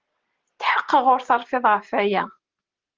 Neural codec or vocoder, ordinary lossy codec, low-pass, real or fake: none; Opus, 16 kbps; 7.2 kHz; real